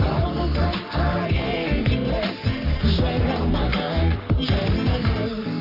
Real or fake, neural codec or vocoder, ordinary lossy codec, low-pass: fake; codec, 44.1 kHz, 3.4 kbps, Pupu-Codec; none; 5.4 kHz